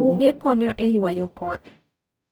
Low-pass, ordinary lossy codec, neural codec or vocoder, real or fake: none; none; codec, 44.1 kHz, 0.9 kbps, DAC; fake